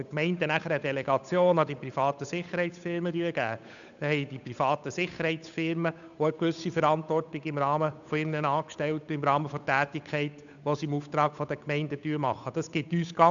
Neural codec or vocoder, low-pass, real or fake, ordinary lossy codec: codec, 16 kHz, 8 kbps, FunCodec, trained on Chinese and English, 25 frames a second; 7.2 kHz; fake; none